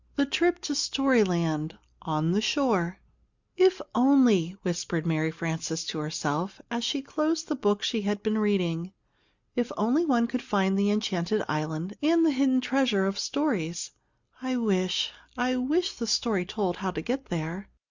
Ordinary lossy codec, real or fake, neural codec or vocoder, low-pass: Opus, 64 kbps; real; none; 7.2 kHz